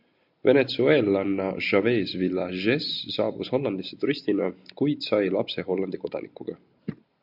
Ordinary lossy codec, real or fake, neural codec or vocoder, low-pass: MP3, 48 kbps; real; none; 5.4 kHz